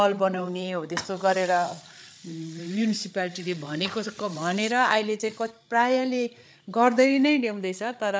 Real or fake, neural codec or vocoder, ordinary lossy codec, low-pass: fake; codec, 16 kHz, 8 kbps, FreqCodec, larger model; none; none